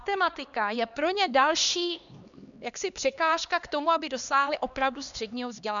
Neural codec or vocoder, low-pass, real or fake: codec, 16 kHz, 2 kbps, X-Codec, HuBERT features, trained on LibriSpeech; 7.2 kHz; fake